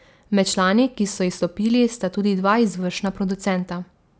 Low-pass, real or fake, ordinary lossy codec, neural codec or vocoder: none; real; none; none